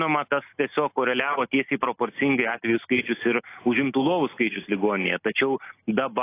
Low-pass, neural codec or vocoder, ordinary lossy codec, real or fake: 3.6 kHz; none; AAC, 24 kbps; real